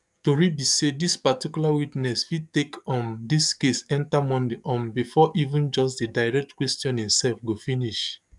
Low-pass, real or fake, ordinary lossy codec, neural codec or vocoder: 10.8 kHz; fake; none; codec, 44.1 kHz, 7.8 kbps, DAC